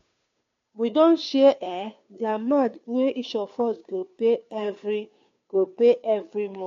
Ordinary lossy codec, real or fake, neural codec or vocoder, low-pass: AAC, 48 kbps; fake; codec, 16 kHz, 2 kbps, FunCodec, trained on Chinese and English, 25 frames a second; 7.2 kHz